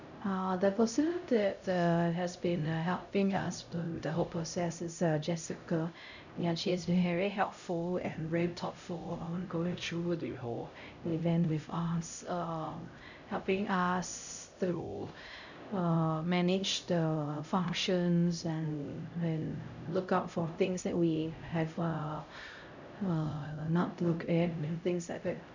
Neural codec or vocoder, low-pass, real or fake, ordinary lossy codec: codec, 16 kHz, 0.5 kbps, X-Codec, HuBERT features, trained on LibriSpeech; 7.2 kHz; fake; none